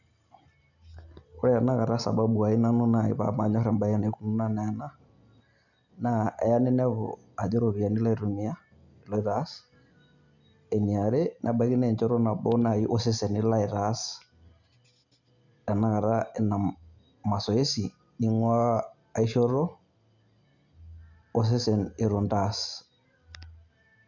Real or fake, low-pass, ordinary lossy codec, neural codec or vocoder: real; 7.2 kHz; none; none